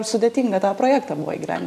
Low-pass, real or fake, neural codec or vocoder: 14.4 kHz; real; none